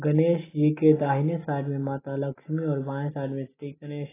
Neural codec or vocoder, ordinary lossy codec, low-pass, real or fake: none; AAC, 16 kbps; 3.6 kHz; real